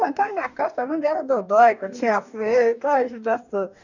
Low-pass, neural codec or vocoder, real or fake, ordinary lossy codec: 7.2 kHz; codec, 44.1 kHz, 2.6 kbps, DAC; fake; none